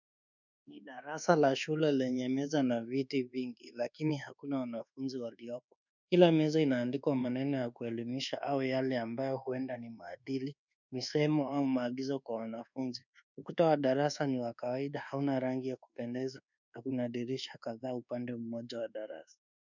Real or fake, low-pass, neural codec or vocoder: fake; 7.2 kHz; codec, 24 kHz, 1.2 kbps, DualCodec